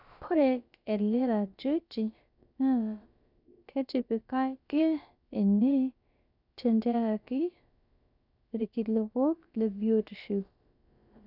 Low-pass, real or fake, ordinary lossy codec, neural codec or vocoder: 5.4 kHz; fake; none; codec, 16 kHz, about 1 kbps, DyCAST, with the encoder's durations